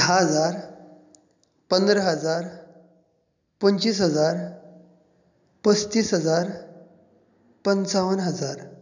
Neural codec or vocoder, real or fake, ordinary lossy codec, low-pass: none; real; none; 7.2 kHz